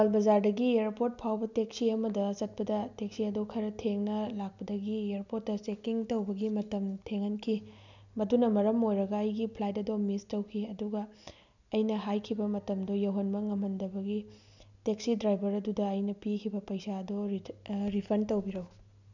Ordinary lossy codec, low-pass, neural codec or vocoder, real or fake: none; 7.2 kHz; none; real